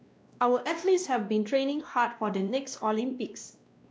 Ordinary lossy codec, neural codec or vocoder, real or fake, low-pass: none; codec, 16 kHz, 1 kbps, X-Codec, WavLM features, trained on Multilingual LibriSpeech; fake; none